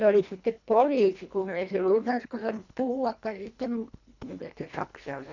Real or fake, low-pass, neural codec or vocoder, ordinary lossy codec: fake; 7.2 kHz; codec, 24 kHz, 1.5 kbps, HILCodec; none